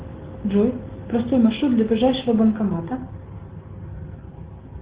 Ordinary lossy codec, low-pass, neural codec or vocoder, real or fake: Opus, 16 kbps; 3.6 kHz; none; real